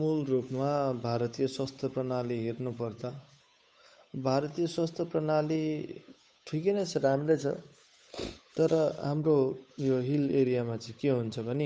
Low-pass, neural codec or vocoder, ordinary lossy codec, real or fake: none; codec, 16 kHz, 8 kbps, FunCodec, trained on Chinese and English, 25 frames a second; none; fake